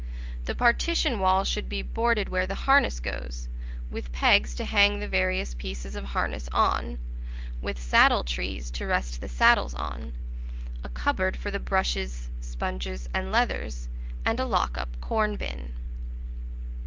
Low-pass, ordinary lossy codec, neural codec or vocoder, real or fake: 7.2 kHz; Opus, 32 kbps; none; real